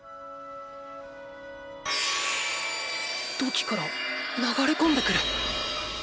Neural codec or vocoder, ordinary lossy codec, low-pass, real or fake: none; none; none; real